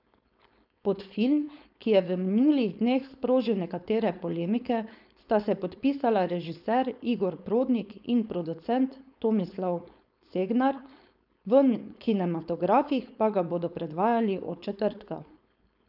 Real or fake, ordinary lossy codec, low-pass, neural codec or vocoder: fake; none; 5.4 kHz; codec, 16 kHz, 4.8 kbps, FACodec